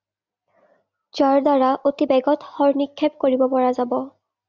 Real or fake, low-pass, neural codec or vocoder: real; 7.2 kHz; none